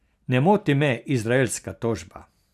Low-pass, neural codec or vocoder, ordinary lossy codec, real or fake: 14.4 kHz; none; none; real